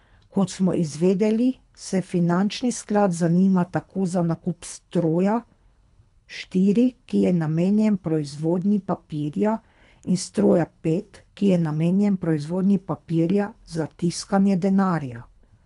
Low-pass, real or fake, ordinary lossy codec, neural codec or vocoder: 10.8 kHz; fake; none; codec, 24 kHz, 3 kbps, HILCodec